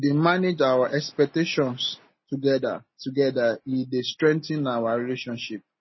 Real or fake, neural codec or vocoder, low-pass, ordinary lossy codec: real; none; 7.2 kHz; MP3, 24 kbps